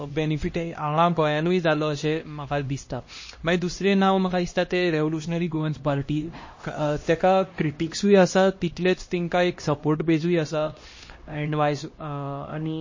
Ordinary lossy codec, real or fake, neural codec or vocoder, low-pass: MP3, 32 kbps; fake; codec, 16 kHz, 1 kbps, X-Codec, HuBERT features, trained on LibriSpeech; 7.2 kHz